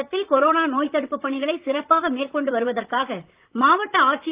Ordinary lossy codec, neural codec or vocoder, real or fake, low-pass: Opus, 24 kbps; vocoder, 44.1 kHz, 128 mel bands, Pupu-Vocoder; fake; 3.6 kHz